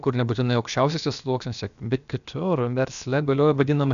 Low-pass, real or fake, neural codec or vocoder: 7.2 kHz; fake; codec, 16 kHz, about 1 kbps, DyCAST, with the encoder's durations